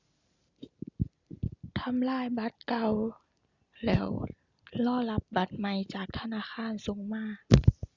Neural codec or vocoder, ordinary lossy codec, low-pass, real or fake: none; Opus, 64 kbps; 7.2 kHz; real